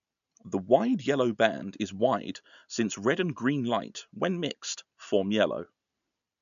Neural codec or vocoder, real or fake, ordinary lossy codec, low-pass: none; real; none; 7.2 kHz